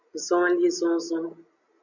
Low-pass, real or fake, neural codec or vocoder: 7.2 kHz; real; none